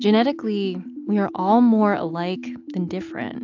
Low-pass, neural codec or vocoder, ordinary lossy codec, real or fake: 7.2 kHz; none; AAC, 48 kbps; real